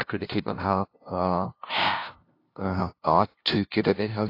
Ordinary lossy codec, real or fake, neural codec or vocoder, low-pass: AAC, 32 kbps; fake; codec, 16 kHz, 0.5 kbps, FunCodec, trained on LibriTTS, 25 frames a second; 5.4 kHz